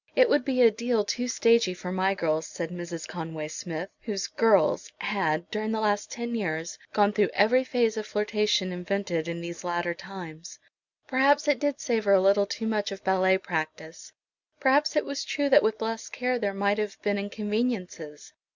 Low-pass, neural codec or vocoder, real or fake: 7.2 kHz; none; real